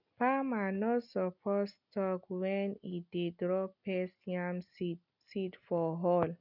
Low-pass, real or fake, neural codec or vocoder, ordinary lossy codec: 5.4 kHz; real; none; none